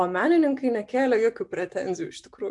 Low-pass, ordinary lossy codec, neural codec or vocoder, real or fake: 10.8 kHz; MP3, 64 kbps; none; real